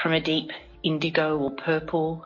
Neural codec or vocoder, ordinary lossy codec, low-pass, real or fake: none; MP3, 32 kbps; 7.2 kHz; real